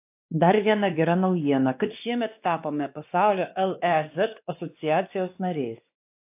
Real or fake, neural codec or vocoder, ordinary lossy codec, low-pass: fake; codec, 16 kHz, 2 kbps, X-Codec, WavLM features, trained on Multilingual LibriSpeech; AAC, 32 kbps; 3.6 kHz